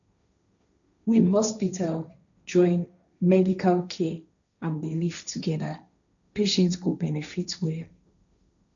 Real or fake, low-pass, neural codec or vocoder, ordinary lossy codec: fake; 7.2 kHz; codec, 16 kHz, 1.1 kbps, Voila-Tokenizer; none